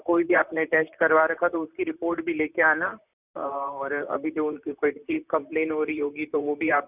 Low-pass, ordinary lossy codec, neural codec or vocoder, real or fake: 3.6 kHz; none; vocoder, 44.1 kHz, 128 mel bands, Pupu-Vocoder; fake